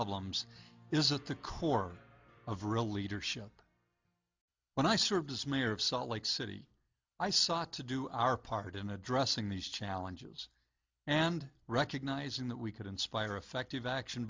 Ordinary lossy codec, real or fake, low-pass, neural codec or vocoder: MP3, 64 kbps; real; 7.2 kHz; none